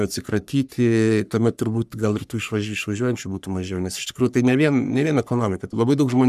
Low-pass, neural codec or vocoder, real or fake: 14.4 kHz; codec, 44.1 kHz, 3.4 kbps, Pupu-Codec; fake